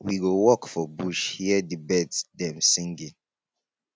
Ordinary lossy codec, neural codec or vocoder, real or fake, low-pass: none; none; real; none